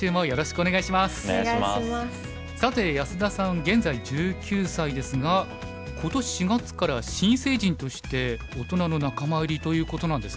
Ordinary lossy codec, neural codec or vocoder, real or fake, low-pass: none; none; real; none